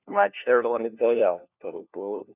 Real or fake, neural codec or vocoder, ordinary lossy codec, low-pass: fake; codec, 16 kHz, 1 kbps, FunCodec, trained on LibriTTS, 50 frames a second; none; 3.6 kHz